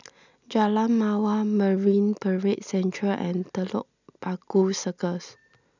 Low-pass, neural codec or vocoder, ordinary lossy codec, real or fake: 7.2 kHz; none; none; real